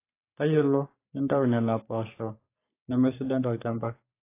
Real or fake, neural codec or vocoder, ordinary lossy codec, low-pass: fake; codec, 44.1 kHz, 3.4 kbps, Pupu-Codec; AAC, 24 kbps; 3.6 kHz